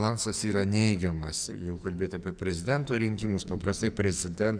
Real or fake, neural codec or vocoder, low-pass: fake; codec, 44.1 kHz, 2.6 kbps, SNAC; 9.9 kHz